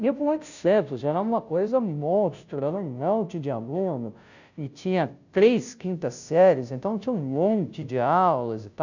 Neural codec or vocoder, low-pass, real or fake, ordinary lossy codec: codec, 16 kHz, 0.5 kbps, FunCodec, trained on Chinese and English, 25 frames a second; 7.2 kHz; fake; none